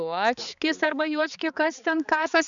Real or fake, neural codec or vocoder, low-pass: fake; codec, 16 kHz, 4 kbps, X-Codec, HuBERT features, trained on balanced general audio; 7.2 kHz